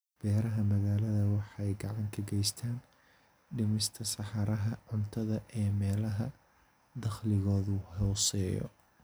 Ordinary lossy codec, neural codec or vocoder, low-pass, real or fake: none; none; none; real